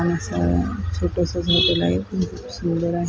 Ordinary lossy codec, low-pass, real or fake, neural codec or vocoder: none; none; real; none